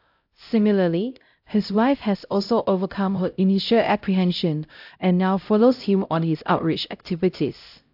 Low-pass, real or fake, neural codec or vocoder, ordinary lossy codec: 5.4 kHz; fake; codec, 16 kHz, 0.5 kbps, X-Codec, HuBERT features, trained on LibriSpeech; AAC, 48 kbps